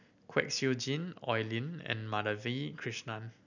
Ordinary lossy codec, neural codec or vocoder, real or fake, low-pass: none; none; real; 7.2 kHz